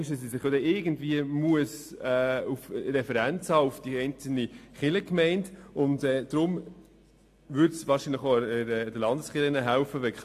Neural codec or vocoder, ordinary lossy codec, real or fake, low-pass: none; AAC, 64 kbps; real; 14.4 kHz